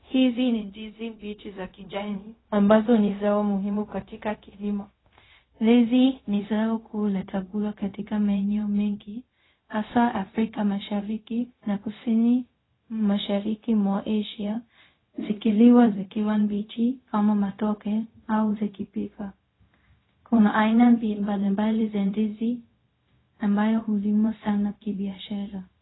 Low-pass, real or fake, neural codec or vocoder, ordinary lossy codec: 7.2 kHz; fake; codec, 16 kHz, 0.4 kbps, LongCat-Audio-Codec; AAC, 16 kbps